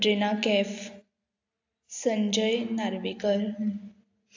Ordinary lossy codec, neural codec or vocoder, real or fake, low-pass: AAC, 48 kbps; none; real; 7.2 kHz